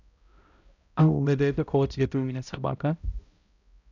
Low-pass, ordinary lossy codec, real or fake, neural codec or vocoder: 7.2 kHz; none; fake; codec, 16 kHz, 0.5 kbps, X-Codec, HuBERT features, trained on balanced general audio